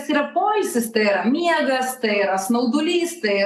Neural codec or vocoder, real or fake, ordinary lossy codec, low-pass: none; real; AAC, 96 kbps; 14.4 kHz